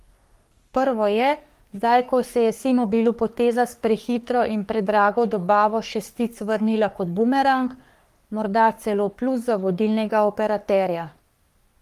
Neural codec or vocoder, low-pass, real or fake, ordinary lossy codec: codec, 44.1 kHz, 3.4 kbps, Pupu-Codec; 14.4 kHz; fake; Opus, 32 kbps